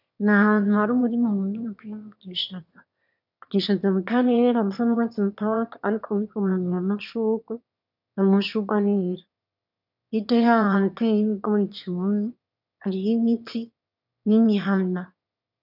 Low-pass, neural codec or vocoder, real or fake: 5.4 kHz; autoencoder, 22.05 kHz, a latent of 192 numbers a frame, VITS, trained on one speaker; fake